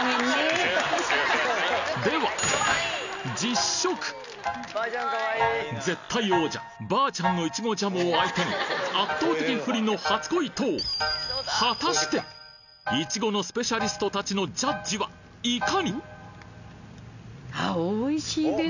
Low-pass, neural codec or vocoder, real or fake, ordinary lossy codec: 7.2 kHz; none; real; none